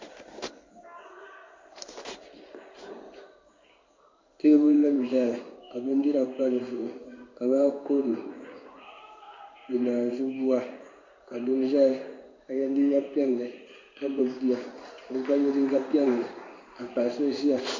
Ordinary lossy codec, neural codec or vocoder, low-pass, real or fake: MP3, 48 kbps; codec, 16 kHz in and 24 kHz out, 1 kbps, XY-Tokenizer; 7.2 kHz; fake